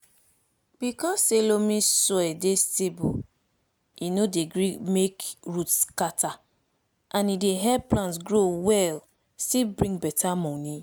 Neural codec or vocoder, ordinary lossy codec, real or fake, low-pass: none; none; real; none